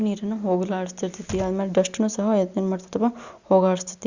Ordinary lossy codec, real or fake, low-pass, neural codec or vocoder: Opus, 64 kbps; real; 7.2 kHz; none